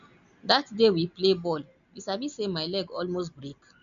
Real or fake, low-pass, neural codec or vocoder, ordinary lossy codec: real; 7.2 kHz; none; none